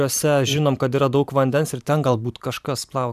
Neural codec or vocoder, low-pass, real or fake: vocoder, 44.1 kHz, 128 mel bands every 512 samples, BigVGAN v2; 14.4 kHz; fake